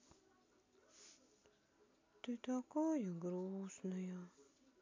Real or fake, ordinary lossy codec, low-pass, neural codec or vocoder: real; MP3, 64 kbps; 7.2 kHz; none